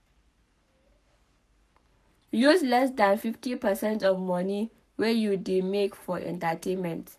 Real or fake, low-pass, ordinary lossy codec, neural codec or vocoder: fake; 14.4 kHz; none; codec, 44.1 kHz, 7.8 kbps, Pupu-Codec